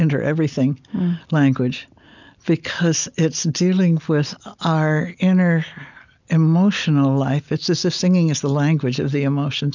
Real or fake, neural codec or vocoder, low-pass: real; none; 7.2 kHz